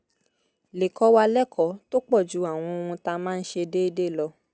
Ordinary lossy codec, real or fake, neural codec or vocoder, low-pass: none; real; none; none